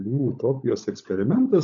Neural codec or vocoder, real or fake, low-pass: codec, 16 kHz, 16 kbps, FunCodec, trained on LibriTTS, 50 frames a second; fake; 7.2 kHz